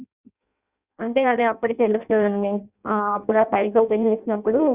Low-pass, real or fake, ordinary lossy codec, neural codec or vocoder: 3.6 kHz; fake; Opus, 64 kbps; codec, 16 kHz in and 24 kHz out, 0.6 kbps, FireRedTTS-2 codec